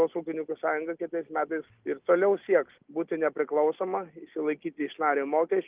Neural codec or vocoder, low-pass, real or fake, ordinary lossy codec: none; 3.6 kHz; real; Opus, 32 kbps